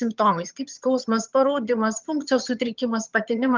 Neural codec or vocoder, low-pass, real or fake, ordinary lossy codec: vocoder, 22.05 kHz, 80 mel bands, HiFi-GAN; 7.2 kHz; fake; Opus, 32 kbps